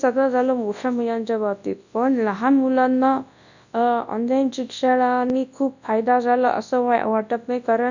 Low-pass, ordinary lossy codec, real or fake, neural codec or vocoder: 7.2 kHz; none; fake; codec, 24 kHz, 0.9 kbps, WavTokenizer, large speech release